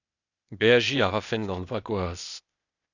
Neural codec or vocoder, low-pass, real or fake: codec, 16 kHz, 0.8 kbps, ZipCodec; 7.2 kHz; fake